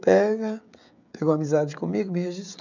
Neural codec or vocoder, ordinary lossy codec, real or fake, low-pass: codec, 16 kHz, 16 kbps, FreqCodec, smaller model; none; fake; 7.2 kHz